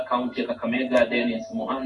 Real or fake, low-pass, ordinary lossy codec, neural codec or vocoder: real; 10.8 kHz; AAC, 48 kbps; none